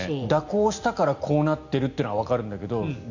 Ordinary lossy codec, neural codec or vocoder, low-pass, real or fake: none; none; 7.2 kHz; real